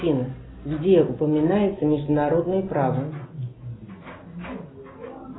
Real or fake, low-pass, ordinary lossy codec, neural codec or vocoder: real; 7.2 kHz; AAC, 16 kbps; none